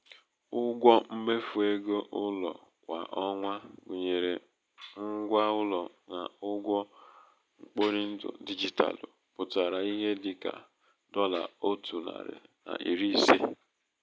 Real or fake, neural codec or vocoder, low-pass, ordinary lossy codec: real; none; none; none